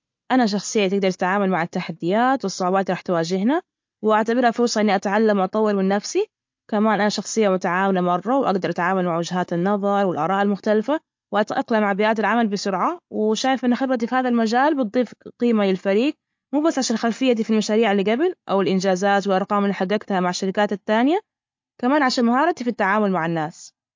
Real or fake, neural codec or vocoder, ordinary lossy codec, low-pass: real; none; MP3, 48 kbps; 7.2 kHz